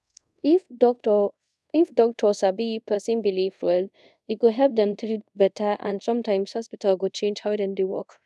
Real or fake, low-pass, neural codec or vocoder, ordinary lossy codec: fake; none; codec, 24 kHz, 0.5 kbps, DualCodec; none